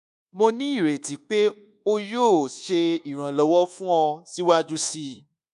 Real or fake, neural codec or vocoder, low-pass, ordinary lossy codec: fake; codec, 24 kHz, 1.2 kbps, DualCodec; 10.8 kHz; AAC, 96 kbps